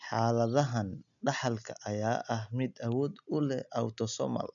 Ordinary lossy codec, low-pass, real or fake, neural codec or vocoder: none; 7.2 kHz; real; none